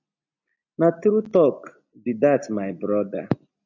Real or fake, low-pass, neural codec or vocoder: real; 7.2 kHz; none